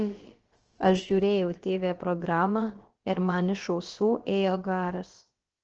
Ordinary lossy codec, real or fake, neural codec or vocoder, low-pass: Opus, 16 kbps; fake; codec, 16 kHz, about 1 kbps, DyCAST, with the encoder's durations; 7.2 kHz